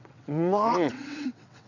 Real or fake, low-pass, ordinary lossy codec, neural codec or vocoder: fake; 7.2 kHz; none; vocoder, 22.05 kHz, 80 mel bands, WaveNeXt